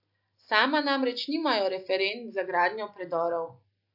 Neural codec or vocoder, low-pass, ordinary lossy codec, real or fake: none; 5.4 kHz; AAC, 48 kbps; real